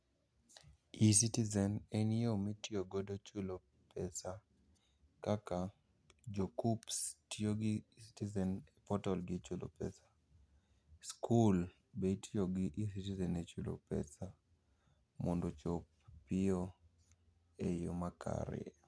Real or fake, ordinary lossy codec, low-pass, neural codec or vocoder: real; none; none; none